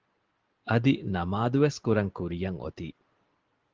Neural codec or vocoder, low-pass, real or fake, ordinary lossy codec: none; 7.2 kHz; real; Opus, 16 kbps